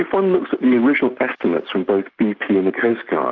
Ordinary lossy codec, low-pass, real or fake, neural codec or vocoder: MP3, 64 kbps; 7.2 kHz; real; none